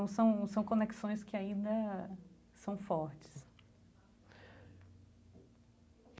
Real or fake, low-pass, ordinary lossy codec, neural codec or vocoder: real; none; none; none